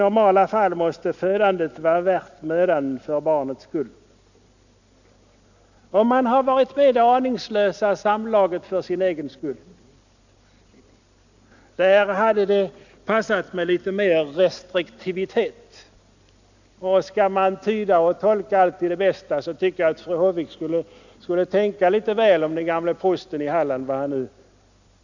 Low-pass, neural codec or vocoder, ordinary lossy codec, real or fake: 7.2 kHz; none; none; real